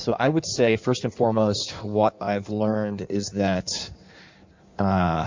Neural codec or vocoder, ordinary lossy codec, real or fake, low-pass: codec, 16 kHz in and 24 kHz out, 1.1 kbps, FireRedTTS-2 codec; MP3, 64 kbps; fake; 7.2 kHz